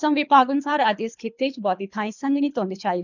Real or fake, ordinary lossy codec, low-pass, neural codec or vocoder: fake; none; 7.2 kHz; codec, 24 kHz, 3 kbps, HILCodec